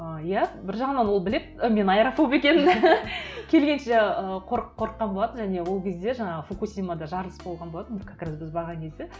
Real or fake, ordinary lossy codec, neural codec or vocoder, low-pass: real; none; none; none